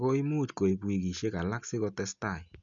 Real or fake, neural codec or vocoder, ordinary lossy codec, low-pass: real; none; none; 7.2 kHz